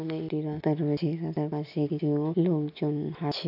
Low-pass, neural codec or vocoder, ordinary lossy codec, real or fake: 5.4 kHz; none; AAC, 48 kbps; real